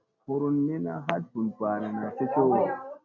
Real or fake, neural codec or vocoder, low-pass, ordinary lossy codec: real; none; 7.2 kHz; MP3, 48 kbps